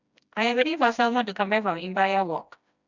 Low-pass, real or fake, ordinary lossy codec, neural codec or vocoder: 7.2 kHz; fake; none; codec, 16 kHz, 1 kbps, FreqCodec, smaller model